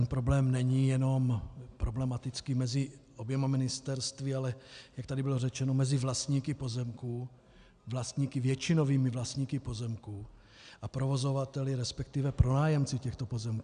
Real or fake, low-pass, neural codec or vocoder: real; 9.9 kHz; none